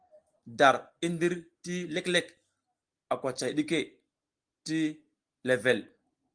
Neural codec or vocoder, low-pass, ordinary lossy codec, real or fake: none; 9.9 kHz; Opus, 24 kbps; real